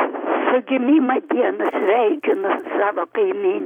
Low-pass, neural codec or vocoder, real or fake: 19.8 kHz; vocoder, 44.1 kHz, 128 mel bands every 256 samples, BigVGAN v2; fake